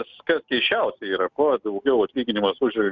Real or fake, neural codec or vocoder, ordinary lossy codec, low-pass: real; none; Opus, 64 kbps; 7.2 kHz